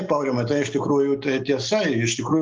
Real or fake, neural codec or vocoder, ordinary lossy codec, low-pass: real; none; Opus, 32 kbps; 7.2 kHz